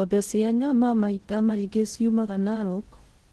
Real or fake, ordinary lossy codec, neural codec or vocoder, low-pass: fake; Opus, 16 kbps; codec, 16 kHz in and 24 kHz out, 0.6 kbps, FocalCodec, streaming, 2048 codes; 10.8 kHz